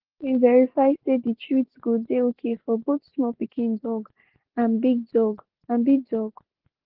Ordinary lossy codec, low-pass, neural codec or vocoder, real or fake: Opus, 16 kbps; 5.4 kHz; none; real